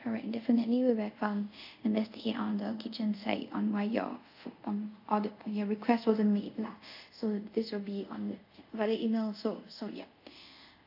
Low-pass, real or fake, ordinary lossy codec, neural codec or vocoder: 5.4 kHz; fake; AAC, 48 kbps; codec, 24 kHz, 0.5 kbps, DualCodec